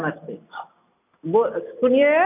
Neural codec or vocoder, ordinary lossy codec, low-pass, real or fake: none; none; 3.6 kHz; real